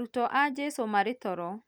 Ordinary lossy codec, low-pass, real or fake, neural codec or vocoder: none; none; real; none